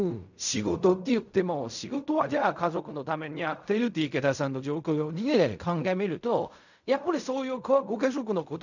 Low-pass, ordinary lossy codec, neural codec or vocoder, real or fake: 7.2 kHz; none; codec, 16 kHz in and 24 kHz out, 0.4 kbps, LongCat-Audio-Codec, fine tuned four codebook decoder; fake